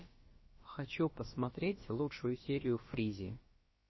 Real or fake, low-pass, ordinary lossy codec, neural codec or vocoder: fake; 7.2 kHz; MP3, 24 kbps; codec, 16 kHz, about 1 kbps, DyCAST, with the encoder's durations